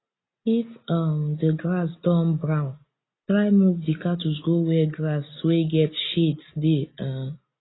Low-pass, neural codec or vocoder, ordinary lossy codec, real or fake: 7.2 kHz; none; AAC, 16 kbps; real